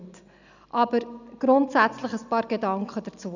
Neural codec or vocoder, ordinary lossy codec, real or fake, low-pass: none; none; real; 7.2 kHz